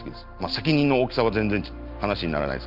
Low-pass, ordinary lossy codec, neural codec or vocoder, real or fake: 5.4 kHz; Opus, 24 kbps; none; real